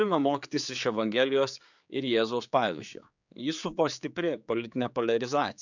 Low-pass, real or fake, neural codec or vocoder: 7.2 kHz; fake; codec, 16 kHz, 4 kbps, X-Codec, HuBERT features, trained on general audio